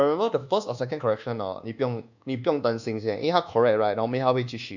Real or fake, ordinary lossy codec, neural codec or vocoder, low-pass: fake; none; codec, 24 kHz, 1.2 kbps, DualCodec; 7.2 kHz